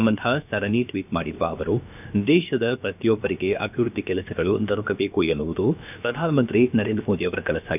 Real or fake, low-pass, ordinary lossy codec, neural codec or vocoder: fake; 3.6 kHz; none; codec, 16 kHz, about 1 kbps, DyCAST, with the encoder's durations